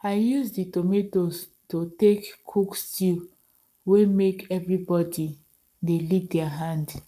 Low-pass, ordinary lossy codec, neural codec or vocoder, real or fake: 14.4 kHz; none; codec, 44.1 kHz, 7.8 kbps, Pupu-Codec; fake